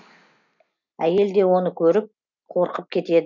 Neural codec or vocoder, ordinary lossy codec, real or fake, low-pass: none; none; real; 7.2 kHz